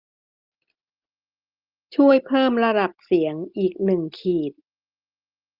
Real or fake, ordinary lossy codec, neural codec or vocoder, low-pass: real; Opus, 24 kbps; none; 5.4 kHz